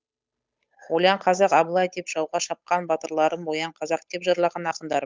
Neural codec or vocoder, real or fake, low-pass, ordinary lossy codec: codec, 16 kHz, 8 kbps, FunCodec, trained on Chinese and English, 25 frames a second; fake; none; none